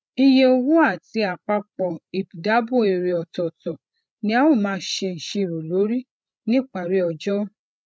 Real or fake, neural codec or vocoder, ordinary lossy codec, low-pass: fake; codec, 16 kHz, 16 kbps, FreqCodec, larger model; none; none